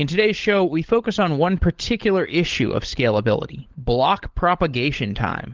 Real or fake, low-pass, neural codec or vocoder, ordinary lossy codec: fake; 7.2 kHz; codec, 16 kHz, 16 kbps, FunCodec, trained on LibriTTS, 50 frames a second; Opus, 16 kbps